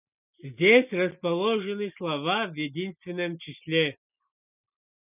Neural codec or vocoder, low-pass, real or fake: none; 3.6 kHz; real